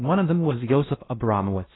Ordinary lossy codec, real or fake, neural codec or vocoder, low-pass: AAC, 16 kbps; fake; codec, 16 kHz in and 24 kHz out, 0.6 kbps, FocalCodec, streaming, 2048 codes; 7.2 kHz